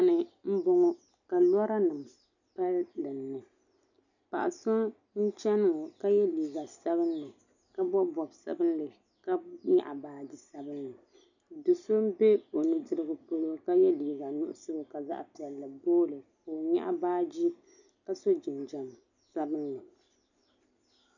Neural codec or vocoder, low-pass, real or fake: none; 7.2 kHz; real